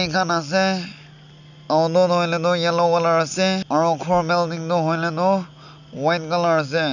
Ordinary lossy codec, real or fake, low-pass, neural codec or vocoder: none; real; 7.2 kHz; none